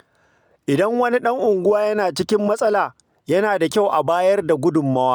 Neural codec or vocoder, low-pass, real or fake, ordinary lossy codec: none; none; real; none